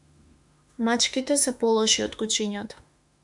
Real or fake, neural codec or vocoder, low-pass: fake; autoencoder, 48 kHz, 32 numbers a frame, DAC-VAE, trained on Japanese speech; 10.8 kHz